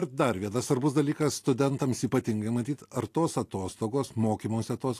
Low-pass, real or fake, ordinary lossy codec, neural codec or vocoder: 14.4 kHz; real; AAC, 64 kbps; none